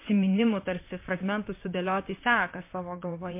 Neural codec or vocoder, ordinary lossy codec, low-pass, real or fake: vocoder, 44.1 kHz, 128 mel bands, Pupu-Vocoder; MP3, 24 kbps; 3.6 kHz; fake